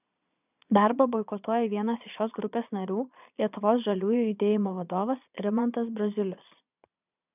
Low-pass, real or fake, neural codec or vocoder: 3.6 kHz; fake; vocoder, 22.05 kHz, 80 mel bands, Vocos